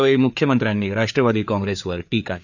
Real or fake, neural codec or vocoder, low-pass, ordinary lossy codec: fake; codec, 16 kHz, 4 kbps, FreqCodec, larger model; 7.2 kHz; none